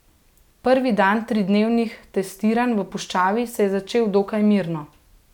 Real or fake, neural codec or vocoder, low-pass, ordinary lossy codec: real; none; 19.8 kHz; none